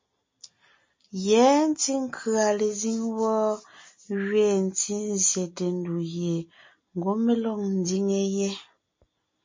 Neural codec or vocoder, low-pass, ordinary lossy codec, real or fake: none; 7.2 kHz; MP3, 32 kbps; real